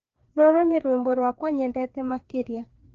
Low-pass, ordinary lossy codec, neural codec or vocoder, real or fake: 7.2 kHz; Opus, 16 kbps; codec, 16 kHz, 2 kbps, FreqCodec, larger model; fake